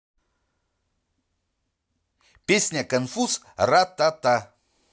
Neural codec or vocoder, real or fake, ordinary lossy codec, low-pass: none; real; none; none